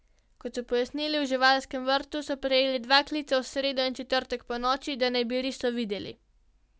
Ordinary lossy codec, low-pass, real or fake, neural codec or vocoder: none; none; real; none